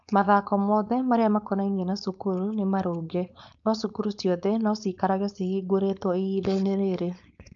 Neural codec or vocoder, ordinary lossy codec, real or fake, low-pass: codec, 16 kHz, 4.8 kbps, FACodec; none; fake; 7.2 kHz